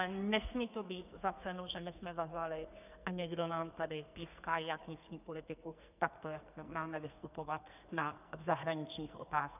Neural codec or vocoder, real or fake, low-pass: codec, 44.1 kHz, 2.6 kbps, SNAC; fake; 3.6 kHz